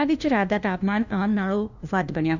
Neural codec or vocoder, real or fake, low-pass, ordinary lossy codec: codec, 16 kHz, 1 kbps, FunCodec, trained on LibriTTS, 50 frames a second; fake; 7.2 kHz; none